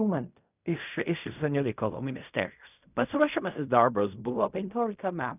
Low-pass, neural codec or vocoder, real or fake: 3.6 kHz; codec, 16 kHz in and 24 kHz out, 0.4 kbps, LongCat-Audio-Codec, fine tuned four codebook decoder; fake